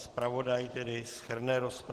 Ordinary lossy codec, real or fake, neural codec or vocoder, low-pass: Opus, 16 kbps; real; none; 14.4 kHz